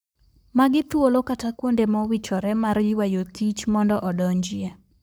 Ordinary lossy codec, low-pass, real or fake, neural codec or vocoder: none; none; fake; codec, 44.1 kHz, 7.8 kbps, Pupu-Codec